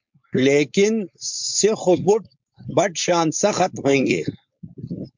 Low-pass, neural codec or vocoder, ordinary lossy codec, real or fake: 7.2 kHz; codec, 16 kHz, 4.8 kbps, FACodec; MP3, 64 kbps; fake